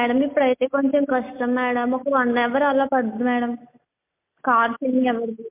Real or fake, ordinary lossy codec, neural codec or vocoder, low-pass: real; MP3, 32 kbps; none; 3.6 kHz